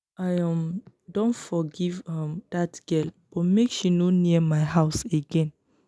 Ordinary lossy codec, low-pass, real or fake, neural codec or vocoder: none; none; real; none